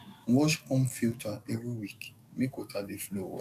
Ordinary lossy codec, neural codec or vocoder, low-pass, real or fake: none; codec, 44.1 kHz, 7.8 kbps, DAC; 14.4 kHz; fake